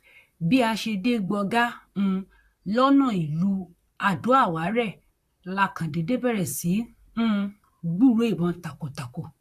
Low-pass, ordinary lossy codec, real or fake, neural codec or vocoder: 14.4 kHz; AAC, 64 kbps; fake; vocoder, 44.1 kHz, 128 mel bands, Pupu-Vocoder